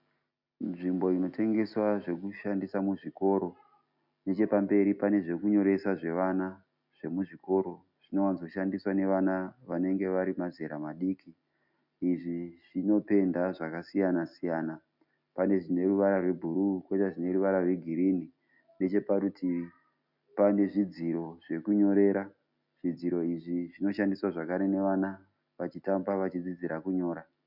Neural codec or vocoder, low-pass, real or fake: none; 5.4 kHz; real